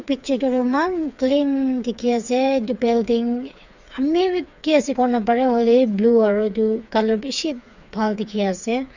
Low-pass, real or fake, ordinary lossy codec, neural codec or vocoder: 7.2 kHz; fake; none; codec, 16 kHz, 4 kbps, FreqCodec, smaller model